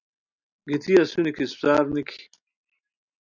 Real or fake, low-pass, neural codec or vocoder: real; 7.2 kHz; none